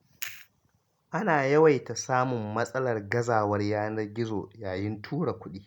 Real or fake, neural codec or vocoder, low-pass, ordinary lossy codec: real; none; 19.8 kHz; none